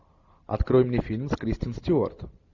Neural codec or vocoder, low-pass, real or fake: none; 7.2 kHz; real